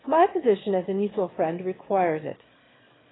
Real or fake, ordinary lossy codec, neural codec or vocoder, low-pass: fake; AAC, 16 kbps; autoencoder, 22.05 kHz, a latent of 192 numbers a frame, VITS, trained on one speaker; 7.2 kHz